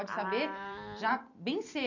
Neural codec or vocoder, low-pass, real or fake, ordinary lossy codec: vocoder, 44.1 kHz, 128 mel bands every 256 samples, BigVGAN v2; 7.2 kHz; fake; none